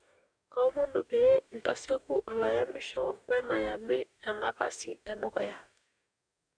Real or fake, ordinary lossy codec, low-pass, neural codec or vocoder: fake; none; 9.9 kHz; codec, 44.1 kHz, 2.6 kbps, DAC